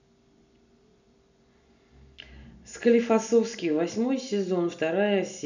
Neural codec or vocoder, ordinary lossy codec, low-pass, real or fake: none; none; 7.2 kHz; real